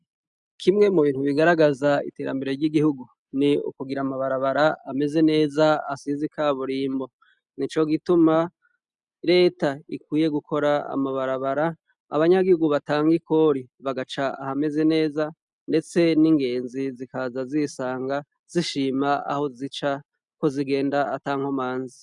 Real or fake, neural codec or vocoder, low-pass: real; none; 10.8 kHz